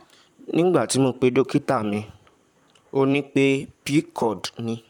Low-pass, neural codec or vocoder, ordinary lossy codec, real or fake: 19.8 kHz; vocoder, 44.1 kHz, 128 mel bands, Pupu-Vocoder; none; fake